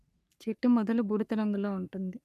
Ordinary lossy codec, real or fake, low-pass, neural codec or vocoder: none; fake; 14.4 kHz; codec, 44.1 kHz, 3.4 kbps, Pupu-Codec